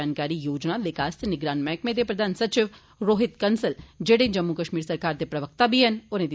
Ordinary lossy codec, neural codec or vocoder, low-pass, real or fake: none; none; none; real